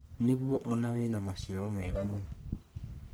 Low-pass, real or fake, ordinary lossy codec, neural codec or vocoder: none; fake; none; codec, 44.1 kHz, 1.7 kbps, Pupu-Codec